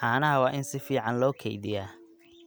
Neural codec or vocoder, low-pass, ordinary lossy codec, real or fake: none; none; none; real